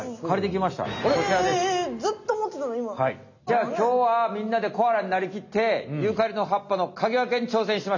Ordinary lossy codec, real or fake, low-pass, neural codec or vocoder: none; real; 7.2 kHz; none